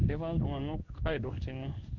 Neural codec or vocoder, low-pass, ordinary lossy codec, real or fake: codec, 16 kHz in and 24 kHz out, 1 kbps, XY-Tokenizer; 7.2 kHz; none; fake